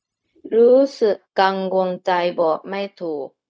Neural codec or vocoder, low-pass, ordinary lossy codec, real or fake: codec, 16 kHz, 0.4 kbps, LongCat-Audio-Codec; none; none; fake